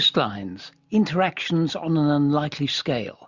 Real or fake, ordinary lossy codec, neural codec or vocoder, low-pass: real; Opus, 64 kbps; none; 7.2 kHz